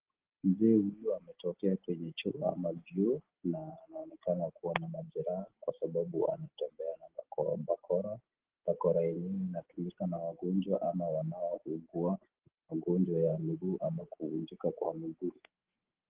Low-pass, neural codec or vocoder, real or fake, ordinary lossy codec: 3.6 kHz; none; real; Opus, 16 kbps